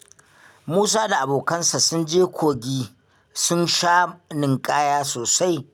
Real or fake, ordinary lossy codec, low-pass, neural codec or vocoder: real; none; 19.8 kHz; none